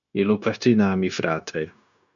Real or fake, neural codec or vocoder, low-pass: fake; codec, 16 kHz, 0.9 kbps, LongCat-Audio-Codec; 7.2 kHz